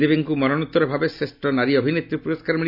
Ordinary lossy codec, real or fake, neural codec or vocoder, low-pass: none; real; none; 5.4 kHz